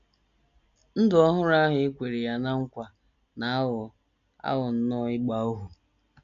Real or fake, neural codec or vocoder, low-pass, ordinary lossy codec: real; none; 7.2 kHz; MP3, 64 kbps